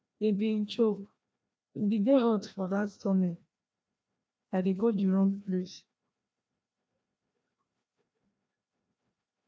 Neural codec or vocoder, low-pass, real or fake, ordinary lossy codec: codec, 16 kHz, 1 kbps, FreqCodec, larger model; none; fake; none